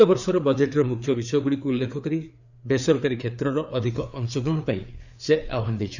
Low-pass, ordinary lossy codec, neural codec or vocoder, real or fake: 7.2 kHz; none; codec, 16 kHz, 4 kbps, FreqCodec, larger model; fake